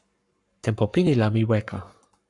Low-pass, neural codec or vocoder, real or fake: 10.8 kHz; codec, 44.1 kHz, 7.8 kbps, Pupu-Codec; fake